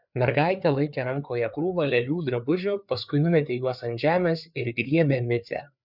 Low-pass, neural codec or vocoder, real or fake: 5.4 kHz; codec, 16 kHz, 4 kbps, FreqCodec, larger model; fake